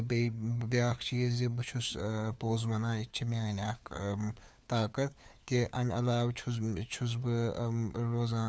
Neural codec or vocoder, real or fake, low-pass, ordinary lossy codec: codec, 16 kHz, 4 kbps, FunCodec, trained on LibriTTS, 50 frames a second; fake; none; none